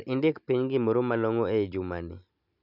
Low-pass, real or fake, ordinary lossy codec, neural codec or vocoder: 5.4 kHz; real; none; none